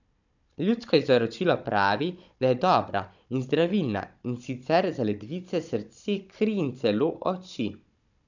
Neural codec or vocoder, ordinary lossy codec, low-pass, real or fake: codec, 16 kHz, 16 kbps, FunCodec, trained on Chinese and English, 50 frames a second; none; 7.2 kHz; fake